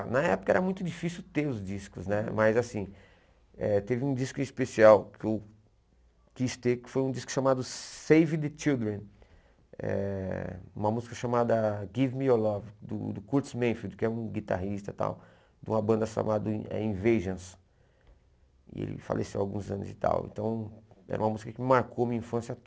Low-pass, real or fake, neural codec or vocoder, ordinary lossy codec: none; real; none; none